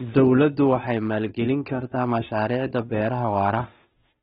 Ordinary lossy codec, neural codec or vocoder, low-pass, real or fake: AAC, 16 kbps; codec, 16 kHz, 2 kbps, FunCodec, trained on Chinese and English, 25 frames a second; 7.2 kHz; fake